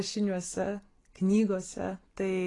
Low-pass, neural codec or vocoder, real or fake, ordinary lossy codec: 10.8 kHz; none; real; AAC, 32 kbps